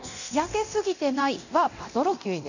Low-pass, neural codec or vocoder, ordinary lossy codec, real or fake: 7.2 kHz; codec, 24 kHz, 0.9 kbps, DualCodec; none; fake